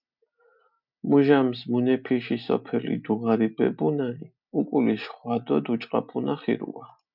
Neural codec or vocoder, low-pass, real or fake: none; 5.4 kHz; real